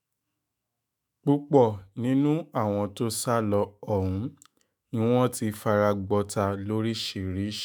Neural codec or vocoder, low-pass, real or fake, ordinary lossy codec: autoencoder, 48 kHz, 128 numbers a frame, DAC-VAE, trained on Japanese speech; none; fake; none